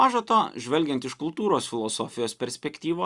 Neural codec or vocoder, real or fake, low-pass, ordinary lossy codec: none; real; 10.8 kHz; Opus, 64 kbps